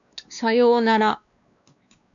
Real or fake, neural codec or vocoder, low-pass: fake; codec, 16 kHz, 2 kbps, X-Codec, WavLM features, trained on Multilingual LibriSpeech; 7.2 kHz